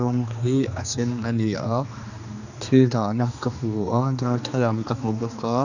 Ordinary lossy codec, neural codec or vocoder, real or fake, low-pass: none; codec, 16 kHz, 2 kbps, X-Codec, HuBERT features, trained on general audio; fake; 7.2 kHz